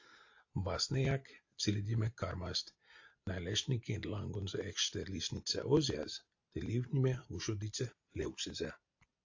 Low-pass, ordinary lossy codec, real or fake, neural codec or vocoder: 7.2 kHz; AAC, 48 kbps; real; none